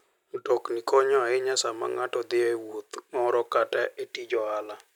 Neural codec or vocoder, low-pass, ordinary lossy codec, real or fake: none; 19.8 kHz; none; real